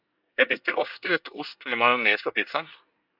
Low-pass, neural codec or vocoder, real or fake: 5.4 kHz; codec, 24 kHz, 1 kbps, SNAC; fake